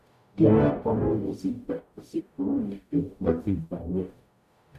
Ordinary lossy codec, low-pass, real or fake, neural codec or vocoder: none; 14.4 kHz; fake; codec, 44.1 kHz, 0.9 kbps, DAC